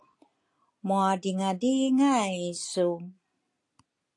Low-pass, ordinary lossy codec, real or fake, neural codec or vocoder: 10.8 kHz; AAC, 48 kbps; real; none